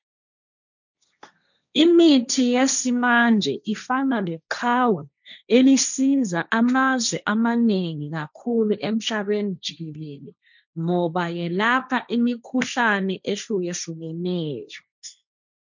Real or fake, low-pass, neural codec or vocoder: fake; 7.2 kHz; codec, 16 kHz, 1.1 kbps, Voila-Tokenizer